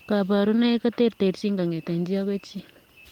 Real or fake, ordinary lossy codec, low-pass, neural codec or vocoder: real; Opus, 24 kbps; 19.8 kHz; none